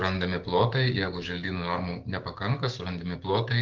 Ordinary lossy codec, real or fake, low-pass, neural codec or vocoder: Opus, 32 kbps; fake; 7.2 kHz; codec, 16 kHz, 6 kbps, DAC